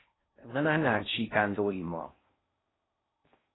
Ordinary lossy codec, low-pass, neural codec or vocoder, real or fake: AAC, 16 kbps; 7.2 kHz; codec, 16 kHz in and 24 kHz out, 0.6 kbps, FocalCodec, streaming, 4096 codes; fake